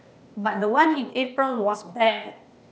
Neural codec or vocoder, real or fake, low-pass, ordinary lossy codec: codec, 16 kHz, 0.8 kbps, ZipCodec; fake; none; none